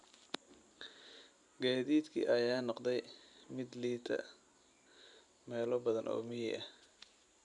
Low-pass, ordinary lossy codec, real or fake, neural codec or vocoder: 10.8 kHz; none; real; none